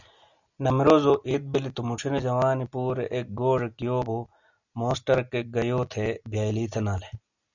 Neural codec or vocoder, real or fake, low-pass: none; real; 7.2 kHz